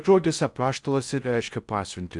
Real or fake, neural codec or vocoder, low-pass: fake; codec, 16 kHz in and 24 kHz out, 0.6 kbps, FocalCodec, streaming, 4096 codes; 10.8 kHz